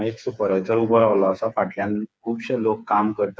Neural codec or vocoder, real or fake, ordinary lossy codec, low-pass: codec, 16 kHz, 4 kbps, FreqCodec, smaller model; fake; none; none